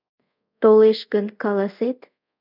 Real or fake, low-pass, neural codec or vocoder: fake; 5.4 kHz; codec, 24 kHz, 0.5 kbps, DualCodec